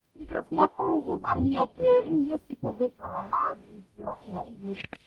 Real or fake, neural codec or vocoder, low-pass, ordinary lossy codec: fake; codec, 44.1 kHz, 0.9 kbps, DAC; 19.8 kHz; Opus, 32 kbps